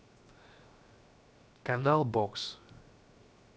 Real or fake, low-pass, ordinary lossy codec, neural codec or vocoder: fake; none; none; codec, 16 kHz, 0.7 kbps, FocalCodec